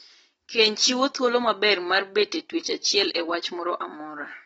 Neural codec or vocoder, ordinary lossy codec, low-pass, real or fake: none; AAC, 24 kbps; 19.8 kHz; real